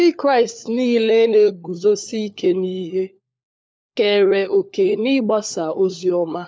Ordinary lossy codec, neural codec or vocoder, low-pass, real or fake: none; codec, 16 kHz, 4 kbps, FunCodec, trained on LibriTTS, 50 frames a second; none; fake